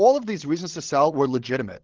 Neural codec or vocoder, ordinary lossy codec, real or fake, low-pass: codec, 16 kHz, 16 kbps, FreqCodec, larger model; Opus, 16 kbps; fake; 7.2 kHz